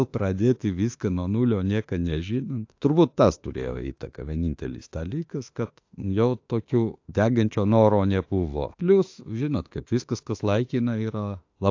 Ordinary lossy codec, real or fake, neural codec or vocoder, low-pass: AAC, 48 kbps; fake; autoencoder, 48 kHz, 32 numbers a frame, DAC-VAE, trained on Japanese speech; 7.2 kHz